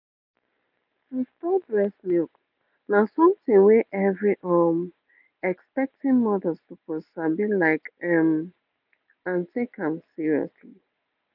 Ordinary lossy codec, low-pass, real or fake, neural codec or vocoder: none; 5.4 kHz; real; none